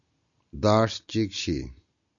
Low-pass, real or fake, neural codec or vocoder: 7.2 kHz; real; none